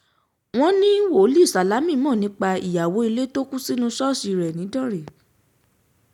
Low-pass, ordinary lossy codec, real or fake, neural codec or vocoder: 19.8 kHz; none; real; none